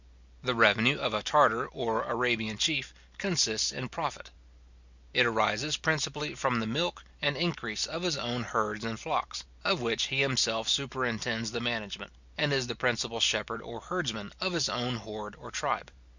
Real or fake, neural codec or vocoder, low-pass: real; none; 7.2 kHz